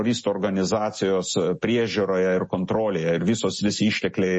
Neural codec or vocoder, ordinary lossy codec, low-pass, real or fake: none; MP3, 32 kbps; 10.8 kHz; real